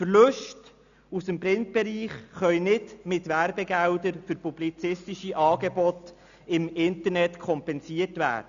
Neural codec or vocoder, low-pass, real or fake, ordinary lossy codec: none; 7.2 kHz; real; none